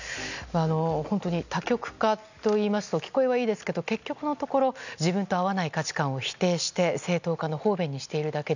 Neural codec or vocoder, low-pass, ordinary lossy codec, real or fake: none; 7.2 kHz; none; real